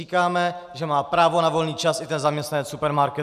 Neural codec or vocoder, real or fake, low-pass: none; real; 14.4 kHz